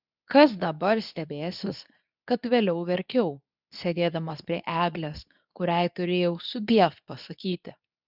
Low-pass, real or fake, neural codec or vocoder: 5.4 kHz; fake; codec, 24 kHz, 0.9 kbps, WavTokenizer, medium speech release version 2